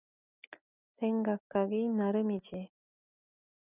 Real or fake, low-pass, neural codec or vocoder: real; 3.6 kHz; none